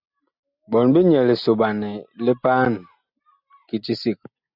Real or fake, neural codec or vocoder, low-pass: real; none; 5.4 kHz